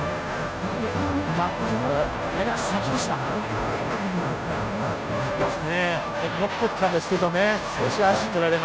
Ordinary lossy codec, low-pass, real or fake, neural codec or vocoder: none; none; fake; codec, 16 kHz, 0.5 kbps, FunCodec, trained on Chinese and English, 25 frames a second